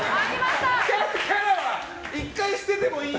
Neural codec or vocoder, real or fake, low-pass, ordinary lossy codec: none; real; none; none